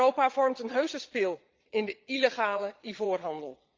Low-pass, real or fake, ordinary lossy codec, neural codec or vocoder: 7.2 kHz; fake; Opus, 32 kbps; vocoder, 22.05 kHz, 80 mel bands, Vocos